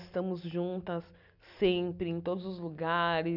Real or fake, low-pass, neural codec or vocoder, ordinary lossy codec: real; 5.4 kHz; none; none